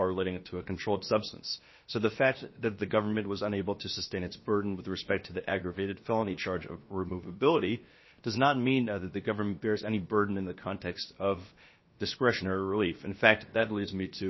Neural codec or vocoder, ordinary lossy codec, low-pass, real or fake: codec, 16 kHz, about 1 kbps, DyCAST, with the encoder's durations; MP3, 24 kbps; 7.2 kHz; fake